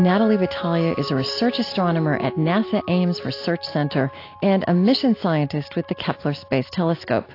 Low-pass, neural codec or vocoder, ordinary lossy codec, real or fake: 5.4 kHz; none; AAC, 32 kbps; real